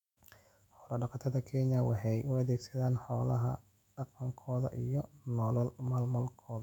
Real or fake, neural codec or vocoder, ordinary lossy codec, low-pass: fake; autoencoder, 48 kHz, 128 numbers a frame, DAC-VAE, trained on Japanese speech; none; 19.8 kHz